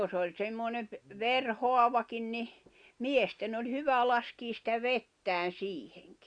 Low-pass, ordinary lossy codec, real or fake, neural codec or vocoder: 9.9 kHz; Opus, 64 kbps; real; none